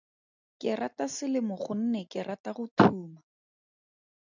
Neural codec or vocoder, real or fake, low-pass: none; real; 7.2 kHz